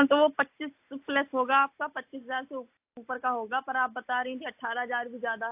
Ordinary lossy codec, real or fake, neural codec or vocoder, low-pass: AAC, 32 kbps; real; none; 3.6 kHz